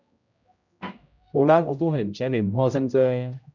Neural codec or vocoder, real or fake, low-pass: codec, 16 kHz, 0.5 kbps, X-Codec, HuBERT features, trained on general audio; fake; 7.2 kHz